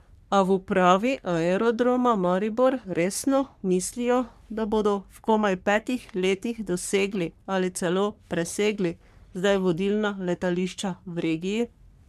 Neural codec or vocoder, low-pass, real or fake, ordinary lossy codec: codec, 44.1 kHz, 3.4 kbps, Pupu-Codec; 14.4 kHz; fake; none